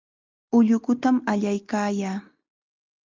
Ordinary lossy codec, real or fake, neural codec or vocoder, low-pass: Opus, 32 kbps; real; none; 7.2 kHz